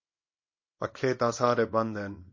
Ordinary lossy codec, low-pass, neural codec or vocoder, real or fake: MP3, 32 kbps; 7.2 kHz; codec, 24 kHz, 0.9 kbps, WavTokenizer, small release; fake